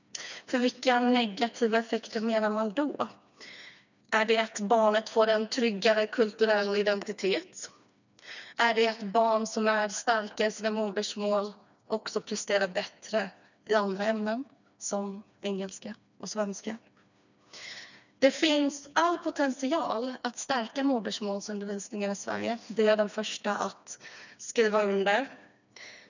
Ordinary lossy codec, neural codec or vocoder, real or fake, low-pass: none; codec, 16 kHz, 2 kbps, FreqCodec, smaller model; fake; 7.2 kHz